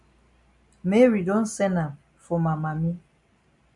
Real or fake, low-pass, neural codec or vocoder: real; 10.8 kHz; none